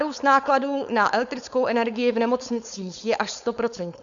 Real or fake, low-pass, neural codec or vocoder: fake; 7.2 kHz; codec, 16 kHz, 4.8 kbps, FACodec